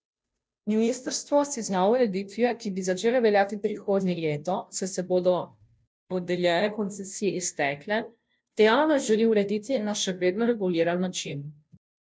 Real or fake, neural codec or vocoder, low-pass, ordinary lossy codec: fake; codec, 16 kHz, 0.5 kbps, FunCodec, trained on Chinese and English, 25 frames a second; none; none